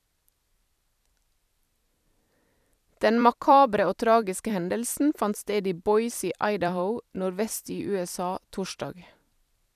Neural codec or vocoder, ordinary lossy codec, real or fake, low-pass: vocoder, 44.1 kHz, 128 mel bands every 256 samples, BigVGAN v2; none; fake; 14.4 kHz